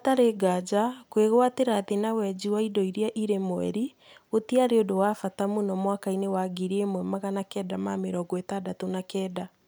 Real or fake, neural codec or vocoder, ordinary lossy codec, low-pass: real; none; none; none